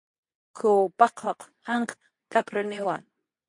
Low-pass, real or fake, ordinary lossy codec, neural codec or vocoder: 10.8 kHz; fake; MP3, 48 kbps; codec, 24 kHz, 0.9 kbps, WavTokenizer, medium speech release version 2